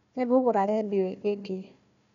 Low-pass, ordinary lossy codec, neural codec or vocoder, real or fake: 7.2 kHz; none; codec, 16 kHz, 1 kbps, FunCodec, trained on Chinese and English, 50 frames a second; fake